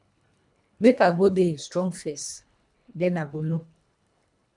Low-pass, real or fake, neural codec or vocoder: 10.8 kHz; fake; codec, 24 kHz, 1.5 kbps, HILCodec